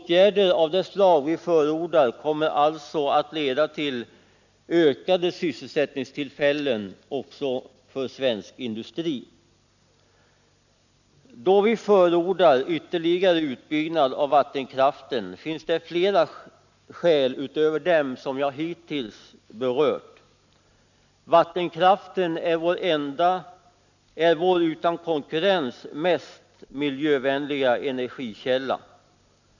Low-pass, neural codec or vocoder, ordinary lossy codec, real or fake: 7.2 kHz; none; none; real